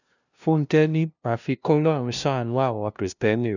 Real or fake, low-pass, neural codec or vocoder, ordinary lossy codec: fake; 7.2 kHz; codec, 16 kHz, 0.5 kbps, FunCodec, trained on LibriTTS, 25 frames a second; none